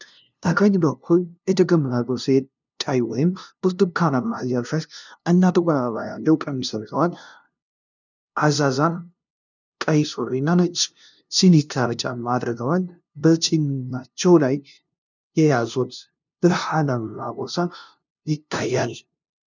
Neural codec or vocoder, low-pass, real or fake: codec, 16 kHz, 0.5 kbps, FunCodec, trained on LibriTTS, 25 frames a second; 7.2 kHz; fake